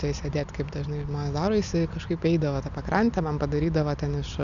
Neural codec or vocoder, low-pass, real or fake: none; 7.2 kHz; real